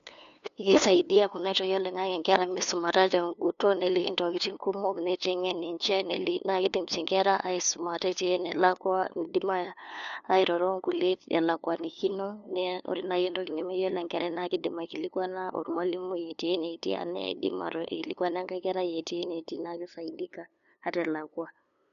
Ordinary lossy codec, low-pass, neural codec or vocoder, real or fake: none; 7.2 kHz; codec, 16 kHz, 2 kbps, FunCodec, trained on LibriTTS, 25 frames a second; fake